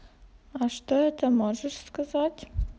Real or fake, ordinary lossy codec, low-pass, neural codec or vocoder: real; none; none; none